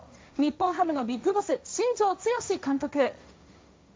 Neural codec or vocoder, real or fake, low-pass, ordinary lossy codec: codec, 16 kHz, 1.1 kbps, Voila-Tokenizer; fake; none; none